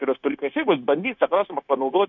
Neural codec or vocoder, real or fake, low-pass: codec, 16 kHz, 0.9 kbps, LongCat-Audio-Codec; fake; 7.2 kHz